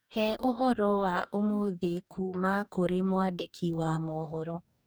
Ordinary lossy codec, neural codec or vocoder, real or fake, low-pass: none; codec, 44.1 kHz, 2.6 kbps, DAC; fake; none